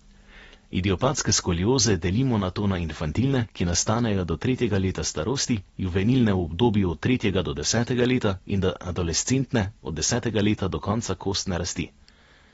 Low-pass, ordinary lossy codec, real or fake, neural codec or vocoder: 19.8 kHz; AAC, 24 kbps; real; none